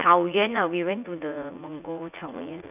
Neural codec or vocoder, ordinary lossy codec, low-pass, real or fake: vocoder, 44.1 kHz, 80 mel bands, Vocos; none; 3.6 kHz; fake